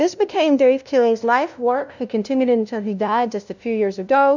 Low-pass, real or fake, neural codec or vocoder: 7.2 kHz; fake; codec, 16 kHz, 0.5 kbps, FunCodec, trained on LibriTTS, 25 frames a second